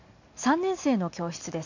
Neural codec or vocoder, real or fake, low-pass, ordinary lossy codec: none; real; 7.2 kHz; none